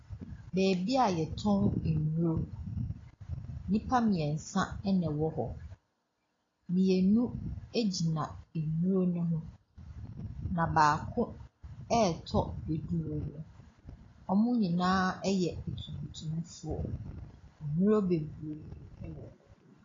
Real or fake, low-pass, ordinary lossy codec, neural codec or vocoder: real; 7.2 kHz; AAC, 32 kbps; none